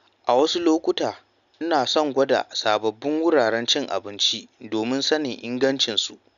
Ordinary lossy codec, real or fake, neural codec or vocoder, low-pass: none; real; none; 7.2 kHz